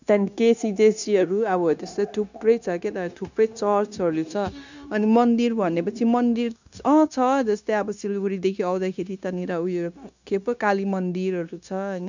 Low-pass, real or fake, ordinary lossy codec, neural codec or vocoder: 7.2 kHz; fake; none; codec, 16 kHz, 0.9 kbps, LongCat-Audio-Codec